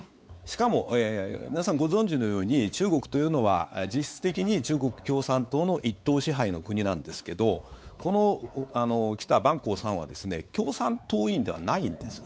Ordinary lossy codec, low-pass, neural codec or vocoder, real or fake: none; none; codec, 16 kHz, 4 kbps, X-Codec, WavLM features, trained on Multilingual LibriSpeech; fake